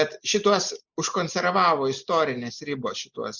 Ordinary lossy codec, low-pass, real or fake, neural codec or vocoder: Opus, 64 kbps; 7.2 kHz; real; none